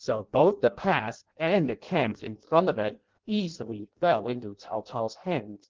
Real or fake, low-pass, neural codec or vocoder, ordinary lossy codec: fake; 7.2 kHz; codec, 16 kHz in and 24 kHz out, 0.6 kbps, FireRedTTS-2 codec; Opus, 16 kbps